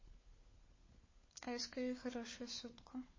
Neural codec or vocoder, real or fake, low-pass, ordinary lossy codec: codec, 16 kHz, 4 kbps, FunCodec, trained on LibriTTS, 50 frames a second; fake; 7.2 kHz; MP3, 32 kbps